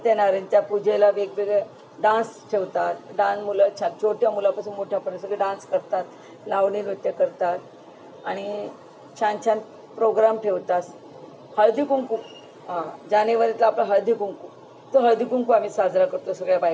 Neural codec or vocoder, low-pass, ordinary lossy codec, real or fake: none; none; none; real